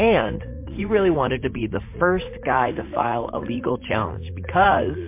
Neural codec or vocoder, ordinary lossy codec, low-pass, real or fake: vocoder, 44.1 kHz, 80 mel bands, Vocos; MP3, 24 kbps; 3.6 kHz; fake